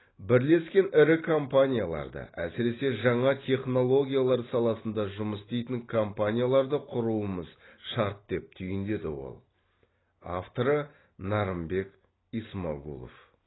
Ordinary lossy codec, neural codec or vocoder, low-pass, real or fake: AAC, 16 kbps; none; 7.2 kHz; real